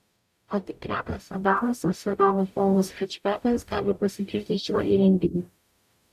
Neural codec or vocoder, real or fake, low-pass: codec, 44.1 kHz, 0.9 kbps, DAC; fake; 14.4 kHz